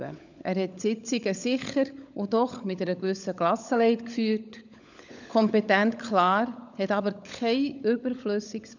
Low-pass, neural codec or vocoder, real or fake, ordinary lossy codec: 7.2 kHz; codec, 16 kHz, 16 kbps, FunCodec, trained on LibriTTS, 50 frames a second; fake; none